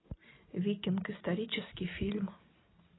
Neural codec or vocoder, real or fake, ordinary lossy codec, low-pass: none; real; AAC, 16 kbps; 7.2 kHz